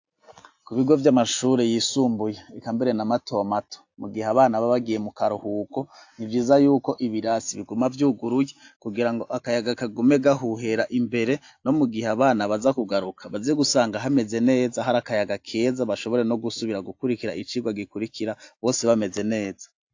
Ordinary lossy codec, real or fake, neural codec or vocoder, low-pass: AAC, 48 kbps; real; none; 7.2 kHz